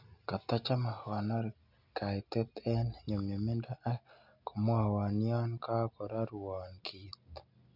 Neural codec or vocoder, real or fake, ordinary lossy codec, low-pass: none; real; none; 5.4 kHz